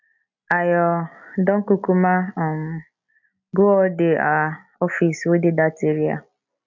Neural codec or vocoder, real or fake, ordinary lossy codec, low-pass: none; real; none; 7.2 kHz